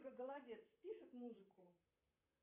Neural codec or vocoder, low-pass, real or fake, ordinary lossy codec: vocoder, 44.1 kHz, 128 mel bands, Pupu-Vocoder; 3.6 kHz; fake; AAC, 24 kbps